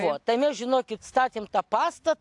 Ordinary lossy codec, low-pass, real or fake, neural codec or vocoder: AAC, 64 kbps; 10.8 kHz; real; none